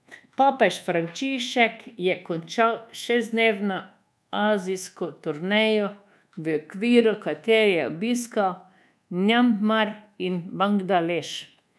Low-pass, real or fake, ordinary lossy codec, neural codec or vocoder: none; fake; none; codec, 24 kHz, 1.2 kbps, DualCodec